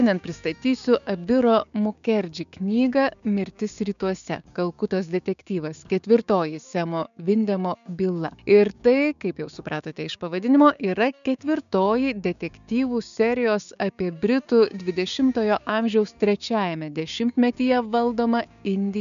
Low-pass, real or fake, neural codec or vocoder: 7.2 kHz; fake; codec, 16 kHz, 6 kbps, DAC